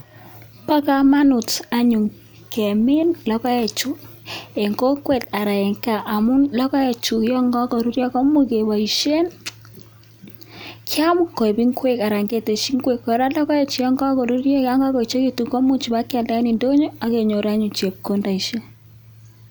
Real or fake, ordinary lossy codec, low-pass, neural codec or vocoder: real; none; none; none